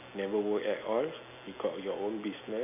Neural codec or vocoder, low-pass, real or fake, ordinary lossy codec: none; 3.6 kHz; real; none